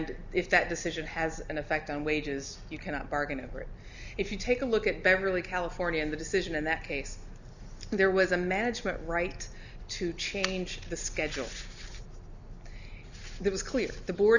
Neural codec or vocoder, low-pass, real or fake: none; 7.2 kHz; real